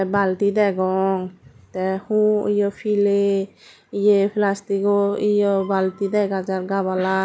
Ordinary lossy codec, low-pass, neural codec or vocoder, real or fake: none; none; none; real